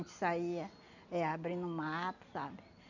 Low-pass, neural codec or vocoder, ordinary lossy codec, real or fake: 7.2 kHz; none; none; real